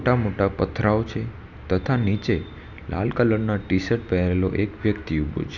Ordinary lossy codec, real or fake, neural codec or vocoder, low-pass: none; real; none; 7.2 kHz